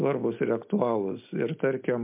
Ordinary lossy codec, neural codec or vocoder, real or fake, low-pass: MP3, 32 kbps; none; real; 3.6 kHz